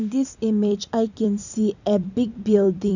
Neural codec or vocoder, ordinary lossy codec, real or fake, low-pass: vocoder, 44.1 kHz, 128 mel bands every 256 samples, BigVGAN v2; none; fake; 7.2 kHz